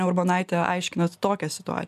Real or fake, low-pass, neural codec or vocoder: real; 14.4 kHz; none